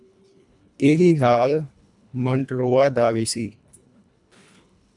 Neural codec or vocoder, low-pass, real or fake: codec, 24 kHz, 1.5 kbps, HILCodec; 10.8 kHz; fake